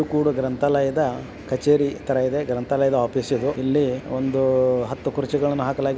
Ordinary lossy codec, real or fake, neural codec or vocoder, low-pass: none; real; none; none